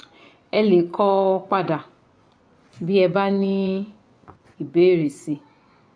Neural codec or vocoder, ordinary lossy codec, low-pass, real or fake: vocoder, 24 kHz, 100 mel bands, Vocos; AAC, 64 kbps; 9.9 kHz; fake